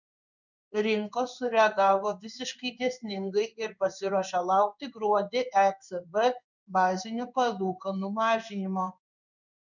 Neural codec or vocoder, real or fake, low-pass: codec, 16 kHz in and 24 kHz out, 1 kbps, XY-Tokenizer; fake; 7.2 kHz